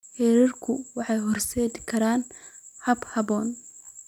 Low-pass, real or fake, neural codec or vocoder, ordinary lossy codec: 19.8 kHz; real; none; none